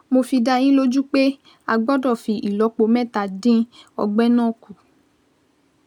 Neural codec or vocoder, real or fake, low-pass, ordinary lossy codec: none; real; 19.8 kHz; none